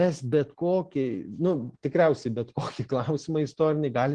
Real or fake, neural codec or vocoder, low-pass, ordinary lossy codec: real; none; 9.9 kHz; Opus, 16 kbps